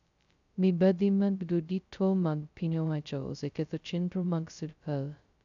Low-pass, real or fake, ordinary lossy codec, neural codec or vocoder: 7.2 kHz; fake; none; codec, 16 kHz, 0.2 kbps, FocalCodec